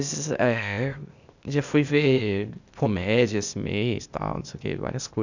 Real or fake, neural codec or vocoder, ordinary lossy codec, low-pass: fake; codec, 16 kHz, 0.8 kbps, ZipCodec; none; 7.2 kHz